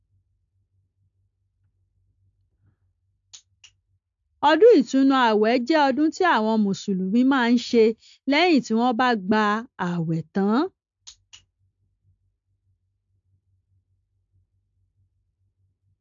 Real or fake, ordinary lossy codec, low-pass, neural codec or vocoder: real; AAC, 64 kbps; 7.2 kHz; none